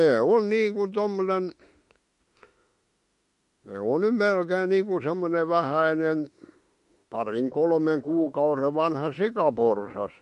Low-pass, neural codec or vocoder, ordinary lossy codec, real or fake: 14.4 kHz; autoencoder, 48 kHz, 32 numbers a frame, DAC-VAE, trained on Japanese speech; MP3, 48 kbps; fake